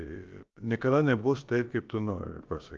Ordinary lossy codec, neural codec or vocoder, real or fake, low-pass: Opus, 32 kbps; codec, 16 kHz, about 1 kbps, DyCAST, with the encoder's durations; fake; 7.2 kHz